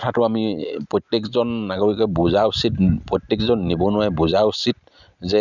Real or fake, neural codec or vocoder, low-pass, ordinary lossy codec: real; none; 7.2 kHz; Opus, 64 kbps